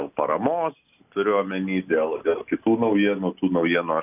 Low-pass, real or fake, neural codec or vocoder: 3.6 kHz; real; none